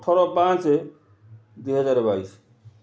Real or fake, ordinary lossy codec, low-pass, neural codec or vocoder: real; none; none; none